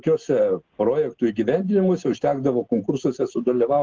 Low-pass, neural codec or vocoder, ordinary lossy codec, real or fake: 7.2 kHz; none; Opus, 16 kbps; real